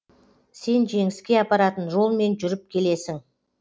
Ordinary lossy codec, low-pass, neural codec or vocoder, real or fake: none; none; none; real